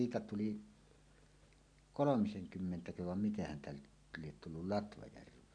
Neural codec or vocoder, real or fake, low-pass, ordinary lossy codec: none; real; 9.9 kHz; none